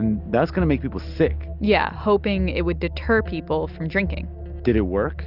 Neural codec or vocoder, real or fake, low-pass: none; real; 5.4 kHz